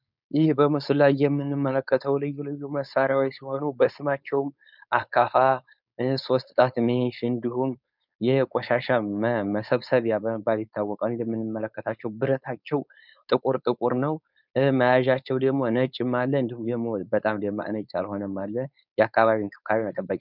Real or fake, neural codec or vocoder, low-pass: fake; codec, 16 kHz, 4.8 kbps, FACodec; 5.4 kHz